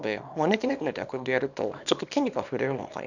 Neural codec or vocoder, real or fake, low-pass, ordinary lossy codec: codec, 24 kHz, 0.9 kbps, WavTokenizer, small release; fake; 7.2 kHz; none